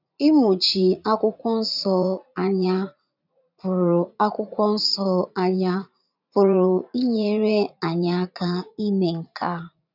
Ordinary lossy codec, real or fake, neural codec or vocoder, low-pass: none; fake; vocoder, 44.1 kHz, 80 mel bands, Vocos; 5.4 kHz